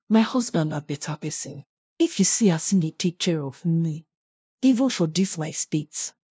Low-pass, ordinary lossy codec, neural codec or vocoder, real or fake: none; none; codec, 16 kHz, 0.5 kbps, FunCodec, trained on LibriTTS, 25 frames a second; fake